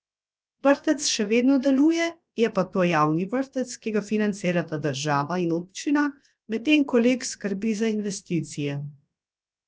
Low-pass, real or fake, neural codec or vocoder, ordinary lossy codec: none; fake; codec, 16 kHz, 0.7 kbps, FocalCodec; none